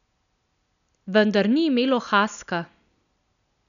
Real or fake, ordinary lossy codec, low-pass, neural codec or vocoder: real; none; 7.2 kHz; none